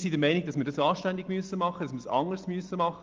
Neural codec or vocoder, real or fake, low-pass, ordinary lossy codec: none; real; 7.2 kHz; Opus, 24 kbps